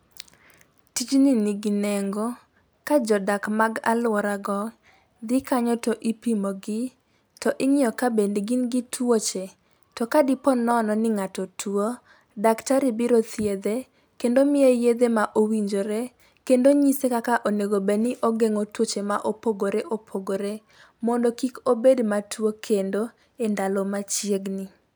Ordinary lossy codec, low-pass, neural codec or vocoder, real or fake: none; none; none; real